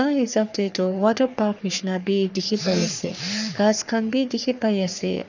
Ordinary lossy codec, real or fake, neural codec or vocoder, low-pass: none; fake; codec, 44.1 kHz, 3.4 kbps, Pupu-Codec; 7.2 kHz